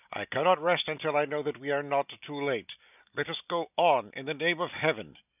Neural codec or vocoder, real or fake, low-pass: none; real; 3.6 kHz